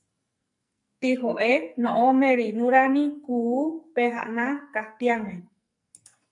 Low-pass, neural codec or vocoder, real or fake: 10.8 kHz; codec, 44.1 kHz, 2.6 kbps, SNAC; fake